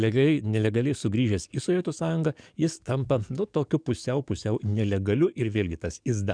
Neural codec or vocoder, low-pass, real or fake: codec, 44.1 kHz, 7.8 kbps, DAC; 9.9 kHz; fake